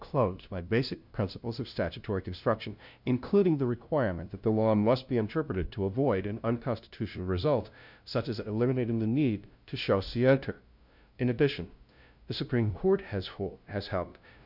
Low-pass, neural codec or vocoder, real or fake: 5.4 kHz; codec, 16 kHz, 0.5 kbps, FunCodec, trained on LibriTTS, 25 frames a second; fake